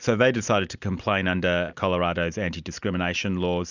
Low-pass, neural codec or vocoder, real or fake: 7.2 kHz; none; real